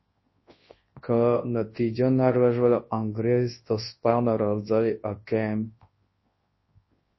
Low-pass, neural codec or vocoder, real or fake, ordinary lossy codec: 7.2 kHz; codec, 24 kHz, 0.9 kbps, WavTokenizer, large speech release; fake; MP3, 24 kbps